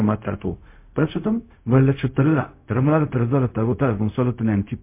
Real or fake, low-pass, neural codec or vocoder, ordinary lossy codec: fake; 3.6 kHz; codec, 16 kHz, 0.4 kbps, LongCat-Audio-Codec; MP3, 24 kbps